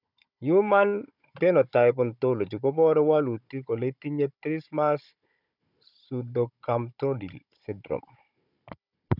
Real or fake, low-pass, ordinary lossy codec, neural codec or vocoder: fake; 5.4 kHz; none; codec, 16 kHz, 16 kbps, FunCodec, trained on Chinese and English, 50 frames a second